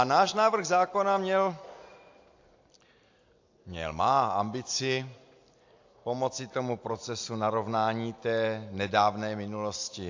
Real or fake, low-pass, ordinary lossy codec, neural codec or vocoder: real; 7.2 kHz; AAC, 48 kbps; none